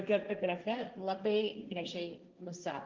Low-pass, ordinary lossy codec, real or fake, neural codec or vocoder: 7.2 kHz; Opus, 32 kbps; fake; codec, 16 kHz, 1.1 kbps, Voila-Tokenizer